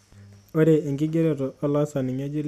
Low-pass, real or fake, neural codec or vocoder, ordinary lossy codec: 14.4 kHz; real; none; none